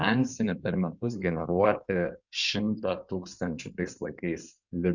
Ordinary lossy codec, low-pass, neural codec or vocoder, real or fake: Opus, 64 kbps; 7.2 kHz; codec, 16 kHz in and 24 kHz out, 2.2 kbps, FireRedTTS-2 codec; fake